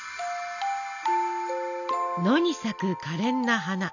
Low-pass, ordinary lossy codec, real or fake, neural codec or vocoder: 7.2 kHz; none; real; none